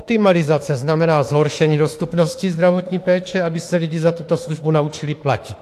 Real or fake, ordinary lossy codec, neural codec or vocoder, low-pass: fake; AAC, 64 kbps; autoencoder, 48 kHz, 32 numbers a frame, DAC-VAE, trained on Japanese speech; 14.4 kHz